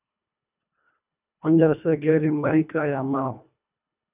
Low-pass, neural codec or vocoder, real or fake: 3.6 kHz; codec, 24 kHz, 1.5 kbps, HILCodec; fake